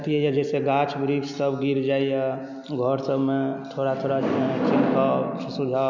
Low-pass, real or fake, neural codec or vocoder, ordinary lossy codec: 7.2 kHz; real; none; none